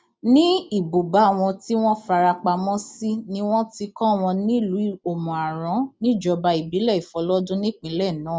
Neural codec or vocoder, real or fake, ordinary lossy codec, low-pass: none; real; none; none